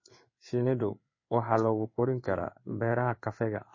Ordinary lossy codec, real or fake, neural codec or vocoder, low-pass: MP3, 32 kbps; fake; codec, 16 kHz in and 24 kHz out, 1 kbps, XY-Tokenizer; 7.2 kHz